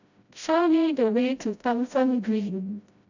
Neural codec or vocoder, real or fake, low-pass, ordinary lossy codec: codec, 16 kHz, 0.5 kbps, FreqCodec, smaller model; fake; 7.2 kHz; none